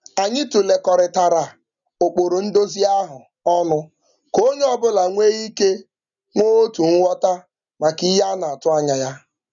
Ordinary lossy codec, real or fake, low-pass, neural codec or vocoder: none; real; 7.2 kHz; none